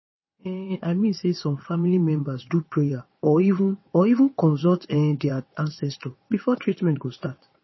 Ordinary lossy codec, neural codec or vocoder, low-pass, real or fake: MP3, 24 kbps; vocoder, 22.05 kHz, 80 mel bands, WaveNeXt; 7.2 kHz; fake